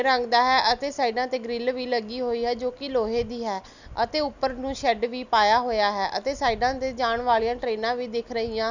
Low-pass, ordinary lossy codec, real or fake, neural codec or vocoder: 7.2 kHz; none; real; none